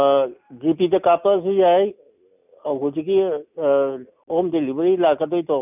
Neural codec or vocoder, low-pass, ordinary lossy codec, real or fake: none; 3.6 kHz; none; real